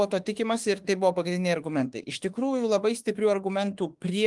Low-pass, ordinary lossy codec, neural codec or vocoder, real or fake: 10.8 kHz; Opus, 24 kbps; autoencoder, 48 kHz, 32 numbers a frame, DAC-VAE, trained on Japanese speech; fake